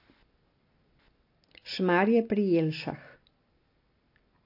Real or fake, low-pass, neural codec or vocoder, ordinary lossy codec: real; 5.4 kHz; none; AAC, 32 kbps